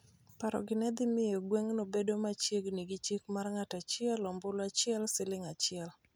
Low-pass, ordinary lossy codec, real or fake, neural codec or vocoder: none; none; real; none